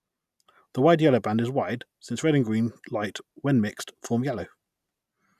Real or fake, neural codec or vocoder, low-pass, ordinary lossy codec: real; none; 14.4 kHz; none